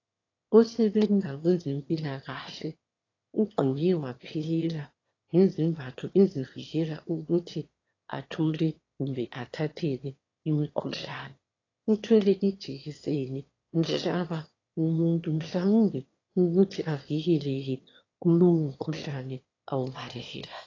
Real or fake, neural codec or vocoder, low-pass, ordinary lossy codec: fake; autoencoder, 22.05 kHz, a latent of 192 numbers a frame, VITS, trained on one speaker; 7.2 kHz; AAC, 32 kbps